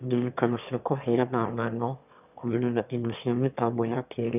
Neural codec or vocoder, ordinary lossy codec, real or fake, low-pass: autoencoder, 22.05 kHz, a latent of 192 numbers a frame, VITS, trained on one speaker; none; fake; 3.6 kHz